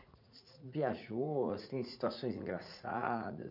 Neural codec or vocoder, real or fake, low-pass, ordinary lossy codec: vocoder, 44.1 kHz, 80 mel bands, Vocos; fake; 5.4 kHz; none